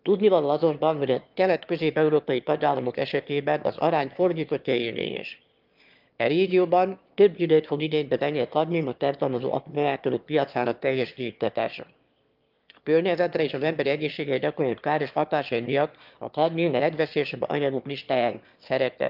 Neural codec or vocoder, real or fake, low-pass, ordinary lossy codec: autoencoder, 22.05 kHz, a latent of 192 numbers a frame, VITS, trained on one speaker; fake; 5.4 kHz; Opus, 24 kbps